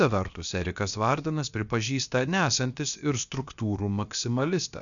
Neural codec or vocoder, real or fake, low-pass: codec, 16 kHz, about 1 kbps, DyCAST, with the encoder's durations; fake; 7.2 kHz